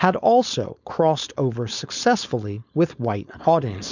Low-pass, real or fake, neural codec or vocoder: 7.2 kHz; fake; codec, 16 kHz, 4.8 kbps, FACodec